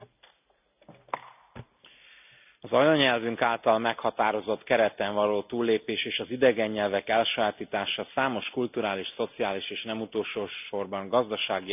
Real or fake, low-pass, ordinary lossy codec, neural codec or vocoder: real; 3.6 kHz; none; none